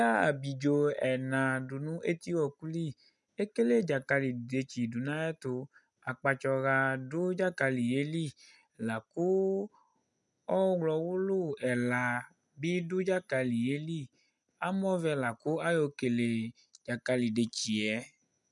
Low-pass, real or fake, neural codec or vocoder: 10.8 kHz; real; none